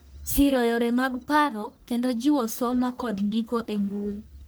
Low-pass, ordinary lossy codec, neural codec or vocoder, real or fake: none; none; codec, 44.1 kHz, 1.7 kbps, Pupu-Codec; fake